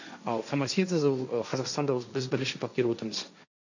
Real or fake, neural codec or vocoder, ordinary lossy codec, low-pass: fake; codec, 16 kHz, 1.1 kbps, Voila-Tokenizer; none; 7.2 kHz